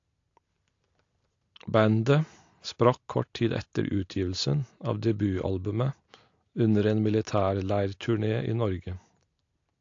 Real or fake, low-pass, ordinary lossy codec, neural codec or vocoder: real; 7.2 kHz; AAC, 48 kbps; none